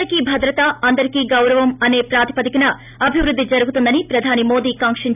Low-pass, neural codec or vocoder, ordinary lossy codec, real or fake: 3.6 kHz; none; none; real